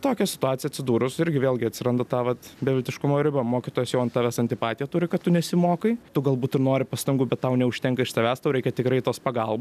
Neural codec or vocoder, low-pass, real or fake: none; 14.4 kHz; real